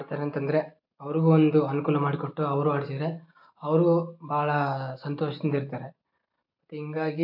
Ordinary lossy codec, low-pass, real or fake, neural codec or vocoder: AAC, 48 kbps; 5.4 kHz; real; none